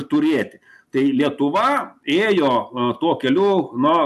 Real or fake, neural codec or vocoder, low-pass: real; none; 14.4 kHz